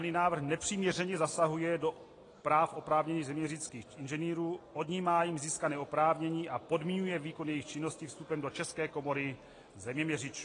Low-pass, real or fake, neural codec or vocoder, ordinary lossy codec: 9.9 kHz; real; none; AAC, 32 kbps